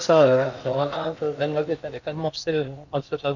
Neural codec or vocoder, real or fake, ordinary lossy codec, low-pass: codec, 16 kHz in and 24 kHz out, 0.8 kbps, FocalCodec, streaming, 65536 codes; fake; none; 7.2 kHz